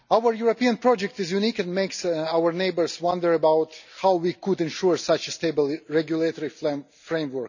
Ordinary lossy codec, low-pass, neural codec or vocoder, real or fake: none; 7.2 kHz; none; real